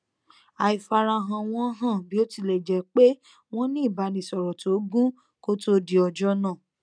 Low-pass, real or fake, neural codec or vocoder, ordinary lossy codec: 9.9 kHz; real; none; none